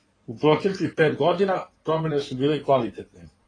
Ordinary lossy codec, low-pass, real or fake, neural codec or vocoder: AAC, 32 kbps; 9.9 kHz; fake; codec, 16 kHz in and 24 kHz out, 2.2 kbps, FireRedTTS-2 codec